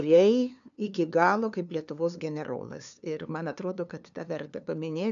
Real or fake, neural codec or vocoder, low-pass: fake; codec, 16 kHz, 2 kbps, FunCodec, trained on LibriTTS, 25 frames a second; 7.2 kHz